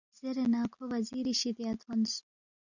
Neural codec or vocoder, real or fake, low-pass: none; real; 7.2 kHz